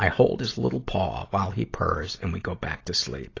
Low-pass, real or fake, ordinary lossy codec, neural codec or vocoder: 7.2 kHz; real; AAC, 32 kbps; none